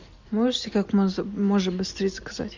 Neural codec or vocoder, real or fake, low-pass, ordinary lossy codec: none; real; 7.2 kHz; MP3, 64 kbps